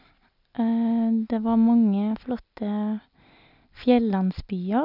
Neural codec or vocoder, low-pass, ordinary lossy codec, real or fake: none; 5.4 kHz; none; real